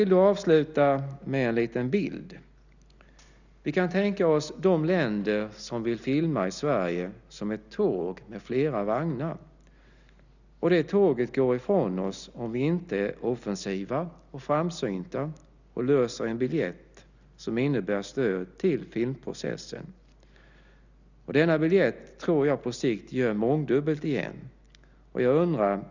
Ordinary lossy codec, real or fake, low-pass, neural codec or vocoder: none; real; 7.2 kHz; none